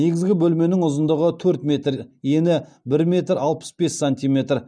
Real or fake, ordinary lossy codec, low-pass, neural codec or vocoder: real; none; 9.9 kHz; none